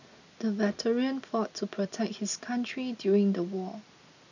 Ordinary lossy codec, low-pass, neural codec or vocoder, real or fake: none; 7.2 kHz; none; real